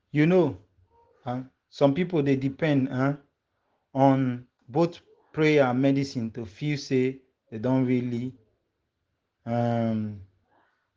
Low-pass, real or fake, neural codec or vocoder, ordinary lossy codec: 7.2 kHz; real; none; Opus, 16 kbps